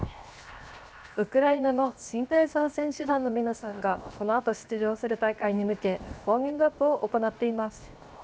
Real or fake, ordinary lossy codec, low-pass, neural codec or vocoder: fake; none; none; codec, 16 kHz, 0.7 kbps, FocalCodec